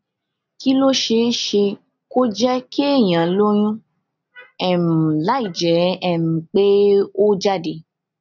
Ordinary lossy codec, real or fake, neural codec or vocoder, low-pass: none; real; none; 7.2 kHz